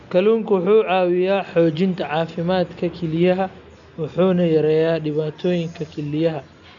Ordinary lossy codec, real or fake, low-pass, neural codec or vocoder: none; real; 7.2 kHz; none